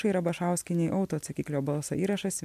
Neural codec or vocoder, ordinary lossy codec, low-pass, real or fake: none; MP3, 96 kbps; 14.4 kHz; real